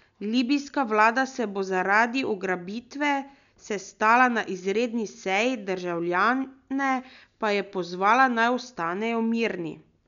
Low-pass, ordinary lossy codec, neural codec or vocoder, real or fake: 7.2 kHz; none; none; real